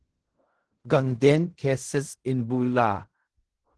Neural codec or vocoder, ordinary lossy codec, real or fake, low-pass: codec, 16 kHz in and 24 kHz out, 0.4 kbps, LongCat-Audio-Codec, fine tuned four codebook decoder; Opus, 16 kbps; fake; 10.8 kHz